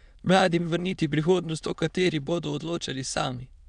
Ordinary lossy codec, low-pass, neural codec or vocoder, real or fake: none; 9.9 kHz; autoencoder, 22.05 kHz, a latent of 192 numbers a frame, VITS, trained on many speakers; fake